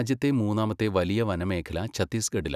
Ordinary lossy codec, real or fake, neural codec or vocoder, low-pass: none; real; none; 14.4 kHz